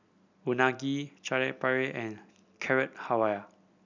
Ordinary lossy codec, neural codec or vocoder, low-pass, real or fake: none; none; 7.2 kHz; real